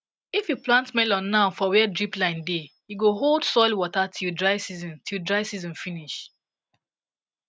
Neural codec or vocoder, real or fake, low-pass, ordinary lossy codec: none; real; none; none